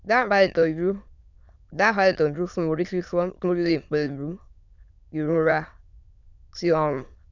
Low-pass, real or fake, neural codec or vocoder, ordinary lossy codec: 7.2 kHz; fake; autoencoder, 22.05 kHz, a latent of 192 numbers a frame, VITS, trained on many speakers; none